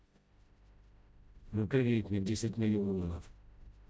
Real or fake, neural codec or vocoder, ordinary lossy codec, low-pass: fake; codec, 16 kHz, 0.5 kbps, FreqCodec, smaller model; none; none